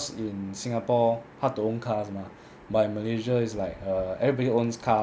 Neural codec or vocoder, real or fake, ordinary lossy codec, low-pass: none; real; none; none